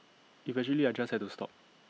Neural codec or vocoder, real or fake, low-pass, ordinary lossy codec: none; real; none; none